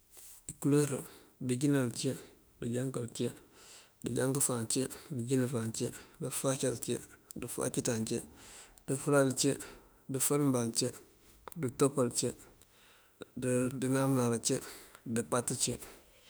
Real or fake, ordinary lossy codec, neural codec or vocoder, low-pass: fake; none; autoencoder, 48 kHz, 32 numbers a frame, DAC-VAE, trained on Japanese speech; none